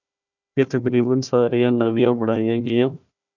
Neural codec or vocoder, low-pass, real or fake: codec, 16 kHz, 1 kbps, FunCodec, trained on Chinese and English, 50 frames a second; 7.2 kHz; fake